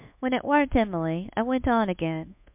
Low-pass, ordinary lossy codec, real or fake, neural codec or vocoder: 3.6 kHz; MP3, 32 kbps; fake; codec, 24 kHz, 1.2 kbps, DualCodec